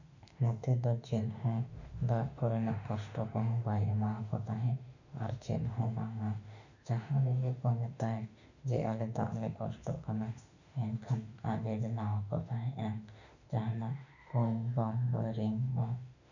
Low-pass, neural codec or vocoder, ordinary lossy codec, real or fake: 7.2 kHz; autoencoder, 48 kHz, 32 numbers a frame, DAC-VAE, trained on Japanese speech; none; fake